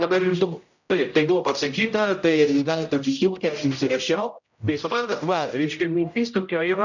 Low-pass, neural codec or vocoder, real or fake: 7.2 kHz; codec, 16 kHz, 0.5 kbps, X-Codec, HuBERT features, trained on general audio; fake